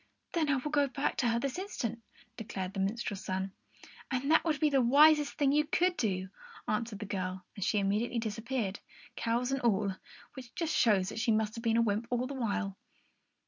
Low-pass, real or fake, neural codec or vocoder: 7.2 kHz; real; none